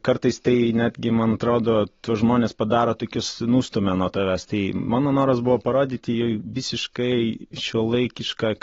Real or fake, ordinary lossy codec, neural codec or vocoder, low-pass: real; AAC, 24 kbps; none; 7.2 kHz